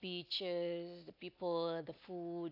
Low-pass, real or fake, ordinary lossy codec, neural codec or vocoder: 5.4 kHz; real; AAC, 32 kbps; none